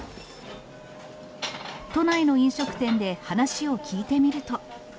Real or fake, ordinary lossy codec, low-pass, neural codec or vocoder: real; none; none; none